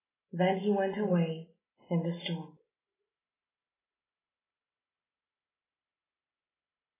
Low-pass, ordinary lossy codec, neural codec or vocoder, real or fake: 3.6 kHz; AAC, 16 kbps; none; real